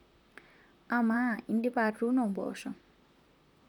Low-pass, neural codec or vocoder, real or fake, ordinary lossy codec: 19.8 kHz; vocoder, 44.1 kHz, 128 mel bands, Pupu-Vocoder; fake; none